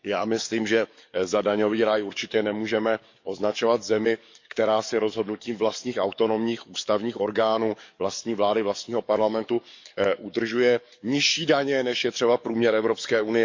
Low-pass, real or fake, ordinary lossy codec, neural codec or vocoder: 7.2 kHz; fake; MP3, 64 kbps; codec, 44.1 kHz, 7.8 kbps, DAC